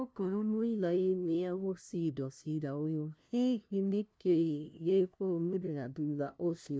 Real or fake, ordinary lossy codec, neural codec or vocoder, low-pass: fake; none; codec, 16 kHz, 0.5 kbps, FunCodec, trained on LibriTTS, 25 frames a second; none